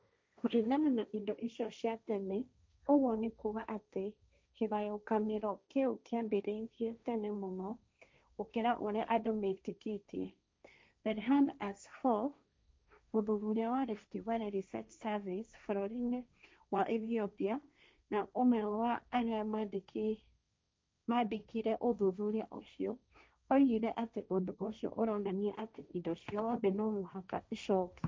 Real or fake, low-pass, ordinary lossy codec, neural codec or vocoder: fake; none; none; codec, 16 kHz, 1.1 kbps, Voila-Tokenizer